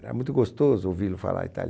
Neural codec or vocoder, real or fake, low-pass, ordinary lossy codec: none; real; none; none